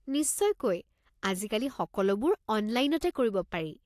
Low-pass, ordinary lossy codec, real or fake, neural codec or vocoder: 14.4 kHz; AAC, 64 kbps; real; none